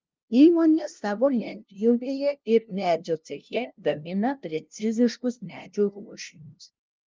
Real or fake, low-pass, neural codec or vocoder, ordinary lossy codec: fake; 7.2 kHz; codec, 16 kHz, 0.5 kbps, FunCodec, trained on LibriTTS, 25 frames a second; Opus, 32 kbps